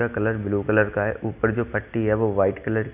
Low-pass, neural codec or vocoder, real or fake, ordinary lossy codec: 3.6 kHz; none; real; none